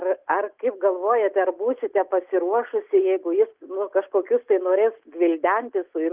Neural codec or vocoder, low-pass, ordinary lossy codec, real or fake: none; 3.6 kHz; Opus, 32 kbps; real